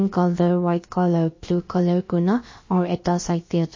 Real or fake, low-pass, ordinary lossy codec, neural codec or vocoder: fake; 7.2 kHz; MP3, 32 kbps; codec, 16 kHz, about 1 kbps, DyCAST, with the encoder's durations